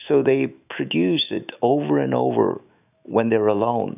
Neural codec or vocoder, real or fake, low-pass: autoencoder, 48 kHz, 128 numbers a frame, DAC-VAE, trained on Japanese speech; fake; 3.6 kHz